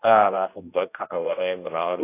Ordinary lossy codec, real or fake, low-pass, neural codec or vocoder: AAC, 24 kbps; fake; 3.6 kHz; codec, 16 kHz, 1 kbps, X-Codec, HuBERT features, trained on general audio